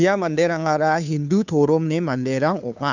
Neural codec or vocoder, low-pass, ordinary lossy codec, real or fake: codec, 16 kHz, 2 kbps, FunCodec, trained on Chinese and English, 25 frames a second; 7.2 kHz; none; fake